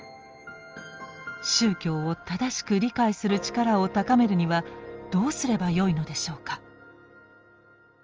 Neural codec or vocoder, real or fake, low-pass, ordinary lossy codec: none; real; 7.2 kHz; Opus, 24 kbps